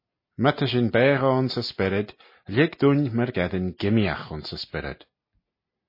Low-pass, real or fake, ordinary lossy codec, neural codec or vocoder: 5.4 kHz; real; MP3, 24 kbps; none